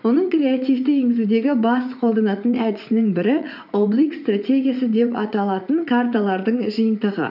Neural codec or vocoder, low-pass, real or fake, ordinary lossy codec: vocoder, 44.1 kHz, 80 mel bands, Vocos; 5.4 kHz; fake; none